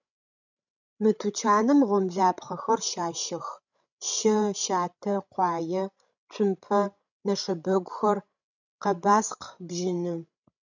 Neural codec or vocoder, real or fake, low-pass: codec, 16 kHz, 16 kbps, FreqCodec, larger model; fake; 7.2 kHz